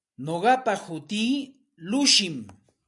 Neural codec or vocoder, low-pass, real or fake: none; 10.8 kHz; real